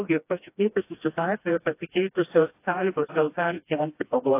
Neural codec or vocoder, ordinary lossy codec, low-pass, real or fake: codec, 16 kHz, 1 kbps, FreqCodec, smaller model; AAC, 24 kbps; 3.6 kHz; fake